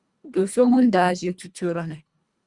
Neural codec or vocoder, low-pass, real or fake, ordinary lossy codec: codec, 24 kHz, 1.5 kbps, HILCodec; 10.8 kHz; fake; Opus, 64 kbps